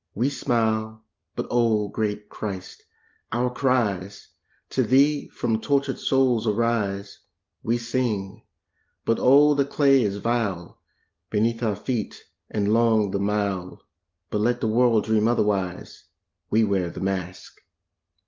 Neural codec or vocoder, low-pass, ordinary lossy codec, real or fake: none; 7.2 kHz; Opus, 32 kbps; real